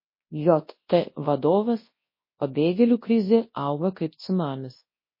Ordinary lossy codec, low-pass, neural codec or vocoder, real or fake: MP3, 24 kbps; 5.4 kHz; codec, 24 kHz, 0.9 kbps, WavTokenizer, large speech release; fake